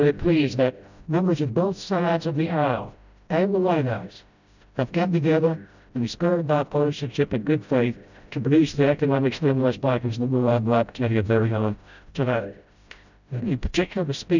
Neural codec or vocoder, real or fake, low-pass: codec, 16 kHz, 0.5 kbps, FreqCodec, smaller model; fake; 7.2 kHz